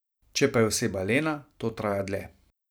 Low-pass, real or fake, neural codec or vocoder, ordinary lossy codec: none; fake; vocoder, 44.1 kHz, 128 mel bands every 512 samples, BigVGAN v2; none